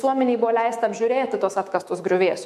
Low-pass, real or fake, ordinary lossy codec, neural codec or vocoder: 14.4 kHz; fake; MP3, 64 kbps; autoencoder, 48 kHz, 128 numbers a frame, DAC-VAE, trained on Japanese speech